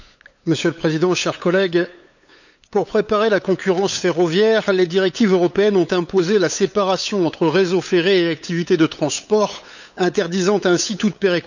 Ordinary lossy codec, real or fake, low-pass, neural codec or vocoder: none; fake; 7.2 kHz; codec, 16 kHz, 8 kbps, FunCodec, trained on LibriTTS, 25 frames a second